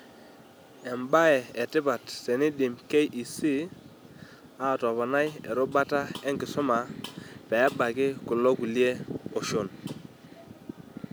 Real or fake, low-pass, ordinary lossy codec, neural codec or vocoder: real; none; none; none